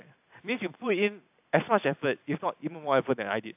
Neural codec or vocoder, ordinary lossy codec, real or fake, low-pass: none; AAC, 32 kbps; real; 3.6 kHz